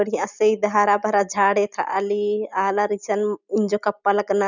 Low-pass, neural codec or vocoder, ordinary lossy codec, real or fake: 7.2 kHz; none; none; real